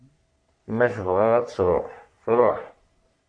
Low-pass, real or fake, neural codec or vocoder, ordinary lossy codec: 9.9 kHz; fake; codec, 44.1 kHz, 3.4 kbps, Pupu-Codec; MP3, 64 kbps